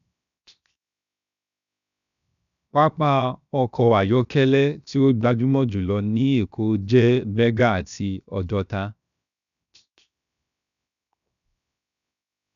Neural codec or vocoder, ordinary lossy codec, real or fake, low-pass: codec, 16 kHz, 0.7 kbps, FocalCodec; none; fake; 7.2 kHz